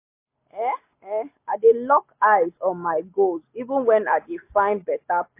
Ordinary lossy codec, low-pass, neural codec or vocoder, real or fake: MP3, 24 kbps; 3.6 kHz; none; real